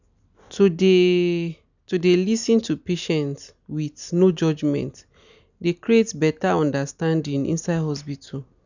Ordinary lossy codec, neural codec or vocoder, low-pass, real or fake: none; none; 7.2 kHz; real